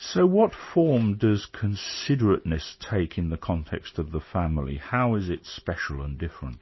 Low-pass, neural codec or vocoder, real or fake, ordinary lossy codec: 7.2 kHz; none; real; MP3, 24 kbps